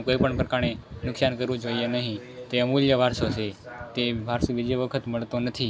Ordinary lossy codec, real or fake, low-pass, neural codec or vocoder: none; real; none; none